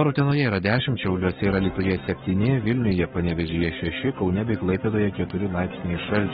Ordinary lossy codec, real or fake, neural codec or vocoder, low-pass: AAC, 16 kbps; fake; codec, 44.1 kHz, 7.8 kbps, Pupu-Codec; 19.8 kHz